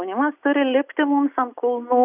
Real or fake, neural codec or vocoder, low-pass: real; none; 3.6 kHz